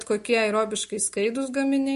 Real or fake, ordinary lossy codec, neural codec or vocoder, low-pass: real; MP3, 48 kbps; none; 14.4 kHz